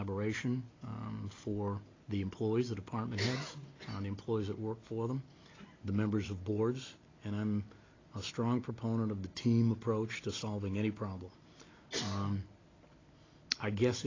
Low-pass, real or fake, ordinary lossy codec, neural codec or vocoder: 7.2 kHz; real; AAC, 32 kbps; none